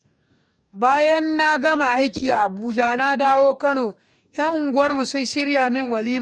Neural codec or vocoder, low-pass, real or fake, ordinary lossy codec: codec, 44.1 kHz, 2.6 kbps, DAC; 9.9 kHz; fake; none